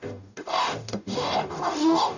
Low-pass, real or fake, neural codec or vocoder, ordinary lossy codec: 7.2 kHz; fake; codec, 44.1 kHz, 0.9 kbps, DAC; none